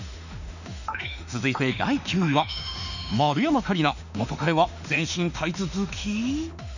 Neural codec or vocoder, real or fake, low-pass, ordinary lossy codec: autoencoder, 48 kHz, 32 numbers a frame, DAC-VAE, trained on Japanese speech; fake; 7.2 kHz; none